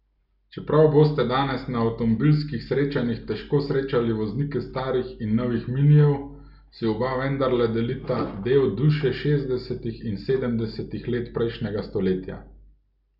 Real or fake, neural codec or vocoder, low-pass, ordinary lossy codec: real; none; 5.4 kHz; none